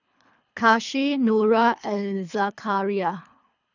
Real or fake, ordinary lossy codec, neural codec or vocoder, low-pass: fake; none; codec, 24 kHz, 3 kbps, HILCodec; 7.2 kHz